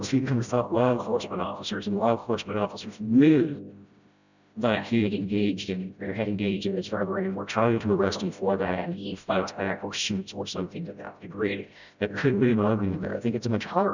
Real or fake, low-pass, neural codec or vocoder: fake; 7.2 kHz; codec, 16 kHz, 0.5 kbps, FreqCodec, smaller model